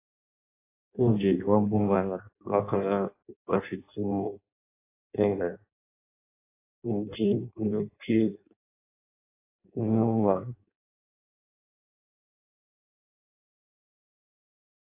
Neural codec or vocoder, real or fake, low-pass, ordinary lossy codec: codec, 16 kHz in and 24 kHz out, 0.6 kbps, FireRedTTS-2 codec; fake; 3.6 kHz; AAC, 24 kbps